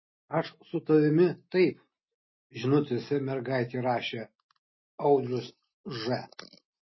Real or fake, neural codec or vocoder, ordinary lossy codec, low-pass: real; none; MP3, 24 kbps; 7.2 kHz